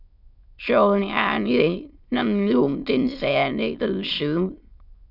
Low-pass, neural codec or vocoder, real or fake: 5.4 kHz; autoencoder, 22.05 kHz, a latent of 192 numbers a frame, VITS, trained on many speakers; fake